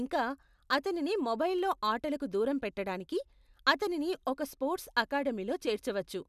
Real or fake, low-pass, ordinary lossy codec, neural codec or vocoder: real; 14.4 kHz; none; none